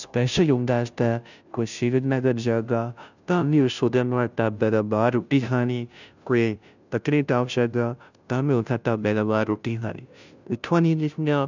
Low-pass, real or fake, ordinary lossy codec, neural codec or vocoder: 7.2 kHz; fake; none; codec, 16 kHz, 0.5 kbps, FunCodec, trained on Chinese and English, 25 frames a second